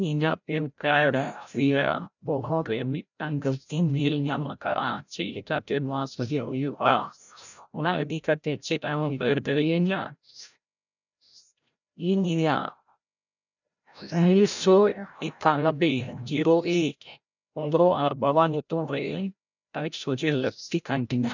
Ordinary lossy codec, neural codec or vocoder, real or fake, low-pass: none; codec, 16 kHz, 0.5 kbps, FreqCodec, larger model; fake; 7.2 kHz